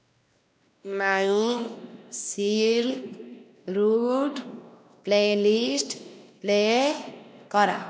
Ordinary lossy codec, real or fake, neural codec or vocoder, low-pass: none; fake; codec, 16 kHz, 1 kbps, X-Codec, WavLM features, trained on Multilingual LibriSpeech; none